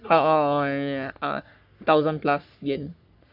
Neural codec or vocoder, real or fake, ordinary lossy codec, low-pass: codec, 44.1 kHz, 3.4 kbps, Pupu-Codec; fake; none; 5.4 kHz